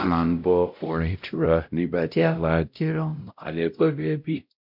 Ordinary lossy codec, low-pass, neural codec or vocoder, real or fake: MP3, 48 kbps; 5.4 kHz; codec, 16 kHz, 0.5 kbps, X-Codec, WavLM features, trained on Multilingual LibriSpeech; fake